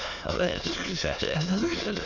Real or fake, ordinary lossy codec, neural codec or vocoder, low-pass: fake; none; autoencoder, 22.05 kHz, a latent of 192 numbers a frame, VITS, trained on many speakers; 7.2 kHz